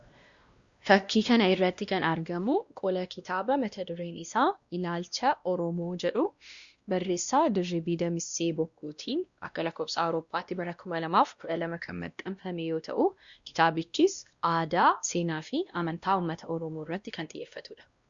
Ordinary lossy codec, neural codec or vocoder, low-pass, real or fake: Opus, 64 kbps; codec, 16 kHz, 1 kbps, X-Codec, WavLM features, trained on Multilingual LibriSpeech; 7.2 kHz; fake